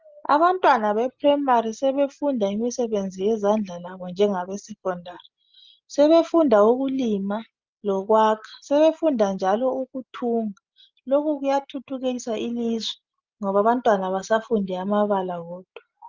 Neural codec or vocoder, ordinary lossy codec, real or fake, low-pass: none; Opus, 24 kbps; real; 7.2 kHz